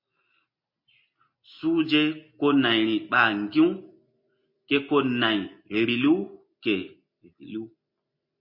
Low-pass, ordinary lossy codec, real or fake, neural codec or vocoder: 5.4 kHz; MP3, 32 kbps; real; none